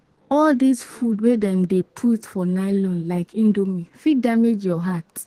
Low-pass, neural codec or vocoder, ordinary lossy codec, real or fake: 14.4 kHz; codec, 32 kHz, 1.9 kbps, SNAC; Opus, 16 kbps; fake